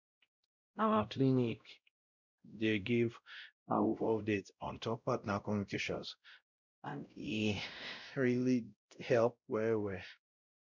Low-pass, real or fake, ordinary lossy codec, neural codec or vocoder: 7.2 kHz; fake; none; codec, 16 kHz, 0.5 kbps, X-Codec, WavLM features, trained on Multilingual LibriSpeech